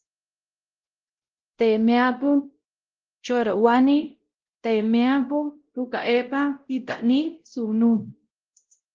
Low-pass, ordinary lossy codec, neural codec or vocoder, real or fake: 7.2 kHz; Opus, 16 kbps; codec, 16 kHz, 0.5 kbps, X-Codec, WavLM features, trained on Multilingual LibriSpeech; fake